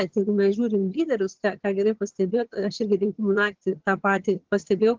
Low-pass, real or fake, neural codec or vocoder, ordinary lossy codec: 7.2 kHz; fake; vocoder, 22.05 kHz, 80 mel bands, HiFi-GAN; Opus, 16 kbps